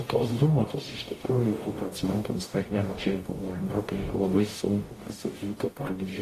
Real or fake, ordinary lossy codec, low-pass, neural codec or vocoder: fake; AAC, 48 kbps; 14.4 kHz; codec, 44.1 kHz, 0.9 kbps, DAC